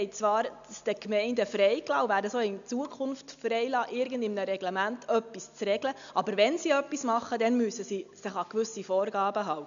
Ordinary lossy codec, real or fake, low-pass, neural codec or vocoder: none; real; 7.2 kHz; none